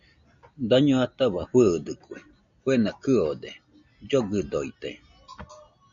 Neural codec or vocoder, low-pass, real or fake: none; 7.2 kHz; real